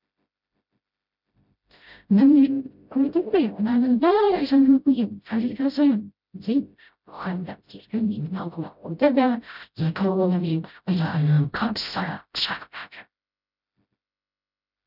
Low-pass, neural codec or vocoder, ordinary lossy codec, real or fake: 5.4 kHz; codec, 16 kHz, 0.5 kbps, FreqCodec, smaller model; none; fake